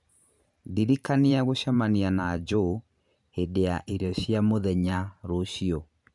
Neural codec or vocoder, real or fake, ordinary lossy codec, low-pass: vocoder, 44.1 kHz, 128 mel bands every 512 samples, BigVGAN v2; fake; none; 10.8 kHz